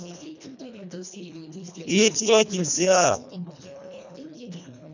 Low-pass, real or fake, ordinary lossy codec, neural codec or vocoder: 7.2 kHz; fake; none; codec, 24 kHz, 1.5 kbps, HILCodec